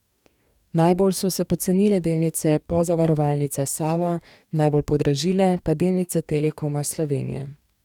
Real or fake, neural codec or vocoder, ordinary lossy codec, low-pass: fake; codec, 44.1 kHz, 2.6 kbps, DAC; none; 19.8 kHz